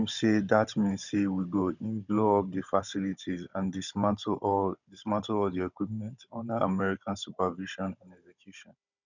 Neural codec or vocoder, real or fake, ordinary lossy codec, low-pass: codec, 16 kHz, 16 kbps, FunCodec, trained on Chinese and English, 50 frames a second; fake; none; 7.2 kHz